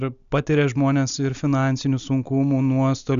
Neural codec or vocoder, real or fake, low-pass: none; real; 7.2 kHz